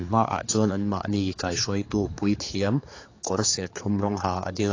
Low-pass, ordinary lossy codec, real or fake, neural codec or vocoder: 7.2 kHz; AAC, 32 kbps; fake; codec, 16 kHz, 4 kbps, X-Codec, HuBERT features, trained on general audio